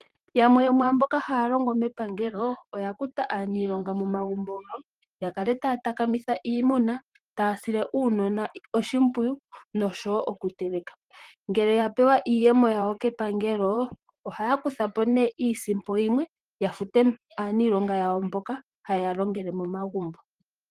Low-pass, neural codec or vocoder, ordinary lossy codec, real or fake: 14.4 kHz; vocoder, 44.1 kHz, 128 mel bands, Pupu-Vocoder; Opus, 24 kbps; fake